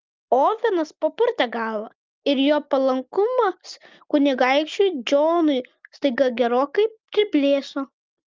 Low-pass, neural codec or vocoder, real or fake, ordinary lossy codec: 7.2 kHz; none; real; Opus, 24 kbps